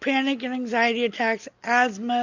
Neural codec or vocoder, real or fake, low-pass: none; real; 7.2 kHz